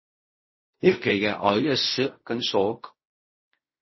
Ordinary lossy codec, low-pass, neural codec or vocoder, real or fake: MP3, 24 kbps; 7.2 kHz; codec, 16 kHz in and 24 kHz out, 0.4 kbps, LongCat-Audio-Codec, fine tuned four codebook decoder; fake